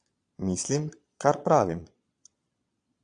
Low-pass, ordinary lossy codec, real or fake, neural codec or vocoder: 9.9 kHz; AAC, 64 kbps; fake; vocoder, 22.05 kHz, 80 mel bands, Vocos